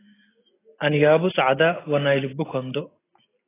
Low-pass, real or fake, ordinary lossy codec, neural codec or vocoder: 3.6 kHz; real; AAC, 16 kbps; none